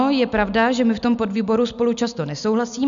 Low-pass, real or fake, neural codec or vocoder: 7.2 kHz; real; none